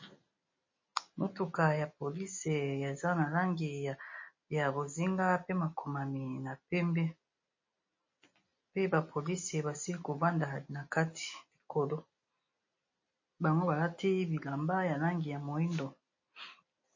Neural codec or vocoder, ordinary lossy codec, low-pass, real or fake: none; MP3, 32 kbps; 7.2 kHz; real